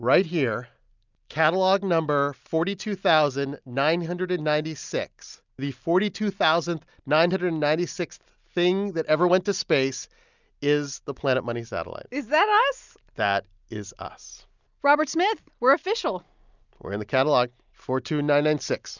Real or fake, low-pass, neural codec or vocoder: real; 7.2 kHz; none